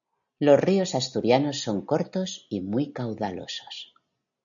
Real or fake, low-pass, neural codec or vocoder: real; 7.2 kHz; none